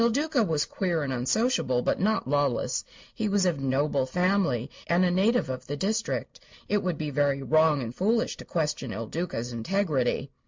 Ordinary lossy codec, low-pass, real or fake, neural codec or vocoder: MP3, 64 kbps; 7.2 kHz; real; none